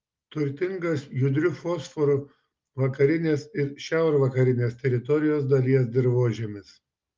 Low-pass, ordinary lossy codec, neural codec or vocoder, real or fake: 7.2 kHz; Opus, 24 kbps; none; real